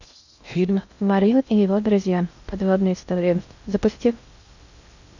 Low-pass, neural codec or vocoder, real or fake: 7.2 kHz; codec, 16 kHz in and 24 kHz out, 0.6 kbps, FocalCodec, streaming, 2048 codes; fake